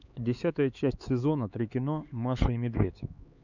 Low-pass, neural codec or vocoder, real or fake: 7.2 kHz; codec, 16 kHz, 4 kbps, X-Codec, HuBERT features, trained on LibriSpeech; fake